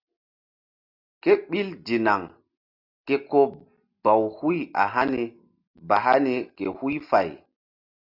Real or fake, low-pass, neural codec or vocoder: real; 5.4 kHz; none